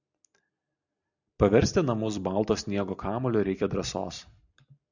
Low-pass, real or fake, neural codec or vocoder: 7.2 kHz; real; none